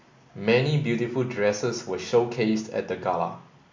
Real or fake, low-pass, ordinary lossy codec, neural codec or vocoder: real; 7.2 kHz; MP3, 48 kbps; none